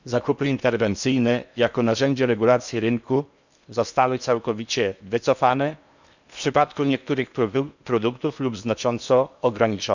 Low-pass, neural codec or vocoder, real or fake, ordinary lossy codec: 7.2 kHz; codec, 16 kHz in and 24 kHz out, 0.8 kbps, FocalCodec, streaming, 65536 codes; fake; none